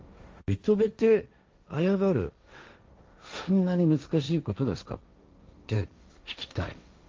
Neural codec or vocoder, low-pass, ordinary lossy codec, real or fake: codec, 16 kHz, 1.1 kbps, Voila-Tokenizer; 7.2 kHz; Opus, 32 kbps; fake